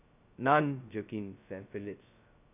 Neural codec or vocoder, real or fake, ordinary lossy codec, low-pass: codec, 16 kHz, 0.2 kbps, FocalCodec; fake; none; 3.6 kHz